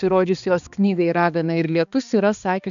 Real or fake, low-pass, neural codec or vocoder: fake; 7.2 kHz; codec, 16 kHz, 2 kbps, X-Codec, HuBERT features, trained on balanced general audio